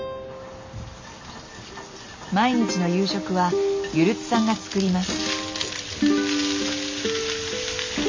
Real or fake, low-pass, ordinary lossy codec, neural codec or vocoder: real; 7.2 kHz; MP3, 48 kbps; none